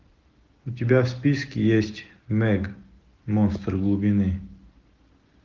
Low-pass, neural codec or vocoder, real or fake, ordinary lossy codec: 7.2 kHz; none; real; Opus, 32 kbps